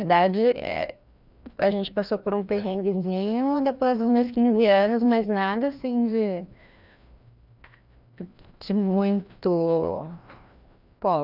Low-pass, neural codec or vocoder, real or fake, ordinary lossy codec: 5.4 kHz; codec, 16 kHz, 1 kbps, FreqCodec, larger model; fake; none